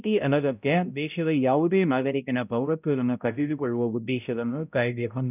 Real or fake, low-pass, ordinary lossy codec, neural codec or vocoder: fake; 3.6 kHz; none; codec, 16 kHz, 0.5 kbps, X-Codec, HuBERT features, trained on balanced general audio